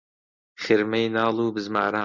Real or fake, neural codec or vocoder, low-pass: real; none; 7.2 kHz